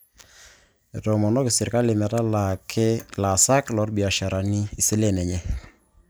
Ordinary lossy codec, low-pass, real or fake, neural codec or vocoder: none; none; real; none